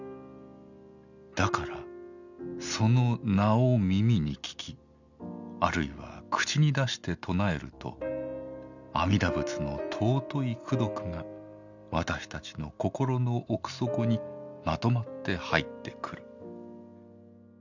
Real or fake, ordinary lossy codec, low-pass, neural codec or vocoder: real; none; 7.2 kHz; none